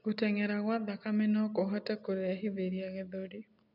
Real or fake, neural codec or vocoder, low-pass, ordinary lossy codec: real; none; 5.4 kHz; none